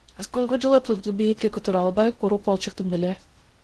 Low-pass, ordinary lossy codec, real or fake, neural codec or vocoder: 10.8 kHz; Opus, 16 kbps; fake; codec, 16 kHz in and 24 kHz out, 0.6 kbps, FocalCodec, streaming, 2048 codes